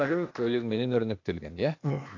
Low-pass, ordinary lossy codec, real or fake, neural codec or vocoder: 7.2 kHz; AAC, 48 kbps; fake; codec, 16 kHz, 0.8 kbps, ZipCodec